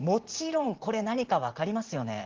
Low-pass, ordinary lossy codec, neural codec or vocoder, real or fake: 7.2 kHz; Opus, 16 kbps; vocoder, 44.1 kHz, 128 mel bands every 512 samples, BigVGAN v2; fake